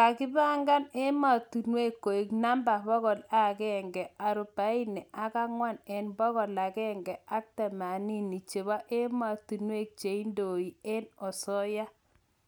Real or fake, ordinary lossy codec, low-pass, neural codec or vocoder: real; none; none; none